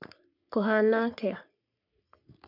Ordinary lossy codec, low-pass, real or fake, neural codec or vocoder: none; 5.4 kHz; fake; codec, 44.1 kHz, 3.4 kbps, Pupu-Codec